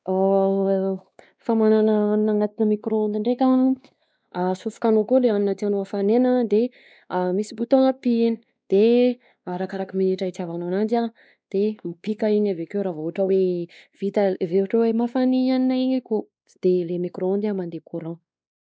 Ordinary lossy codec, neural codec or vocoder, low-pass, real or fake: none; codec, 16 kHz, 2 kbps, X-Codec, WavLM features, trained on Multilingual LibriSpeech; none; fake